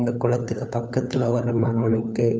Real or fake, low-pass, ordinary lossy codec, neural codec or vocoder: fake; none; none; codec, 16 kHz, 4 kbps, FunCodec, trained on LibriTTS, 50 frames a second